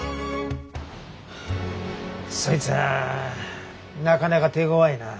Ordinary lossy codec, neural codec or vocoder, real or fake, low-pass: none; none; real; none